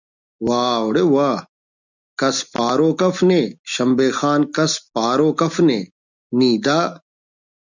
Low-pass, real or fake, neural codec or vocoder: 7.2 kHz; real; none